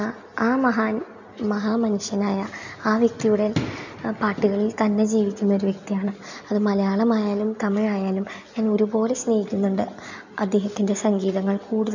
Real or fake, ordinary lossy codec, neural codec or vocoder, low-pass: real; none; none; 7.2 kHz